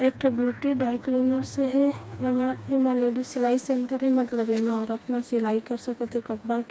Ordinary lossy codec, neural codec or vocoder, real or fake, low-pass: none; codec, 16 kHz, 2 kbps, FreqCodec, smaller model; fake; none